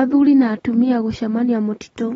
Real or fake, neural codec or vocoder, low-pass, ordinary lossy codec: real; none; 9.9 kHz; AAC, 24 kbps